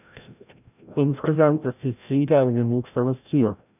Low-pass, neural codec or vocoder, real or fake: 3.6 kHz; codec, 16 kHz, 0.5 kbps, FreqCodec, larger model; fake